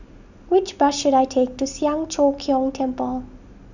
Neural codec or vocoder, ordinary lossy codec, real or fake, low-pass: none; none; real; 7.2 kHz